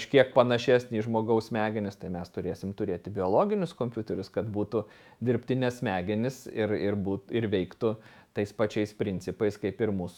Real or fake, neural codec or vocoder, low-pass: fake; autoencoder, 48 kHz, 128 numbers a frame, DAC-VAE, trained on Japanese speech; 19.8 kHz